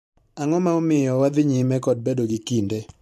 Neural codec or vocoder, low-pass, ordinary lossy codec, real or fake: vocoder, 44.1 kHz, 128 mel bands every 512 samples, BigVGAN v2; 14.4 kHz; MP3, 64 kbps; fake